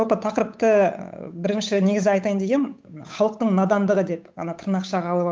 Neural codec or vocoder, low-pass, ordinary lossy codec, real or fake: codec, 16 kHz, 8 kbps, FunCodec, trained on Chinese and English, 25 frames a second; none; none; fake